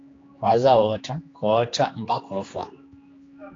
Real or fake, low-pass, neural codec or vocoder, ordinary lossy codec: fake; 7.2 kHz; codec, 16 kHz, 2 kbps, X-Codec, HuBERT features, trained on general audio; AAC, 32 kbps